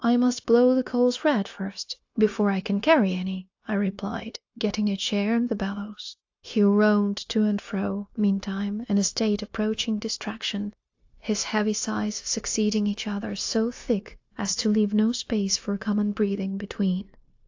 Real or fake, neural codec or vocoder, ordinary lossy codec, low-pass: fake; codec, 16 kHz, 0.9 kbps, LongCat-Audio-Codec; AAC, 48 kbps; 7.2 kHz